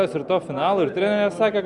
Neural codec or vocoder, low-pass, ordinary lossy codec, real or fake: none; 10.8 kHz; Opus, 64 kbps; real